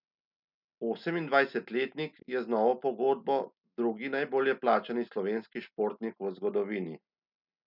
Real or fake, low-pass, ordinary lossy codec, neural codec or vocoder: real; 5.4 kHz; none; none